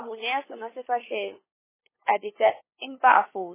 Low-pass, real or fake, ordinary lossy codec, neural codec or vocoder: 3.6 kHz; fake; MP3, 16 kbps; codec, 16 kHz, 2 kbps, FunCodec, trained on LibriTTS, 25 frames a second